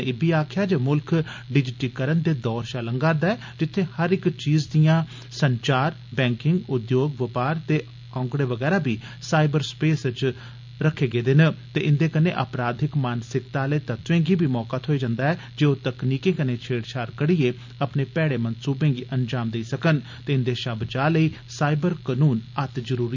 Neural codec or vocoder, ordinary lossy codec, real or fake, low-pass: none; MP3, 64 kbps; real; 7.2 kHz